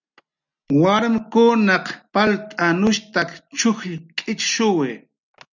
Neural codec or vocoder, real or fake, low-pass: none; real; 7.2 kHz